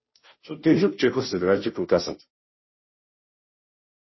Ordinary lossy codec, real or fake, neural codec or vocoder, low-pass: MP3, 24 kbps; fake; codec, 16 kHz, 0.5 kbps, FunCodec, trained on Chinese and English, 25 frames a second; 7.2 kHz